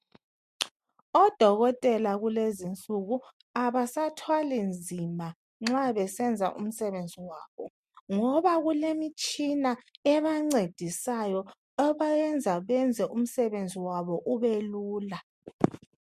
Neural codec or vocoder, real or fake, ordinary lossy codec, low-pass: none; real; MP3, 64 kbps; 14.4 kHz